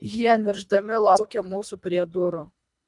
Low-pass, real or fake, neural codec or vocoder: 10.8 kHz; fake; codec, 24 kHz, 1.5 kbps, HILCodec